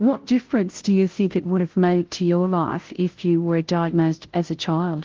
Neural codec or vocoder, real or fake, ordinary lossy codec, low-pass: codec, 16 kHz, 0.5 kbps, FunCodec, trained on Chinese and English, 25 frames a second; fake; Opus, 16 kbps; 7.2 kHz